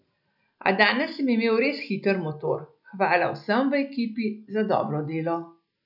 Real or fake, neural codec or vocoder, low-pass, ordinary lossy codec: real; none; 5.4 kHz; none